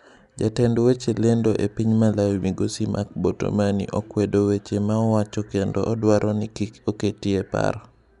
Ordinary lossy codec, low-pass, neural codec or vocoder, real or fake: none; 10.8 kHz; none; real